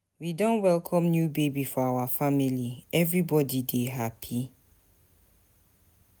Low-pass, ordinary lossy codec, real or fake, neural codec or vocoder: none; none; real; none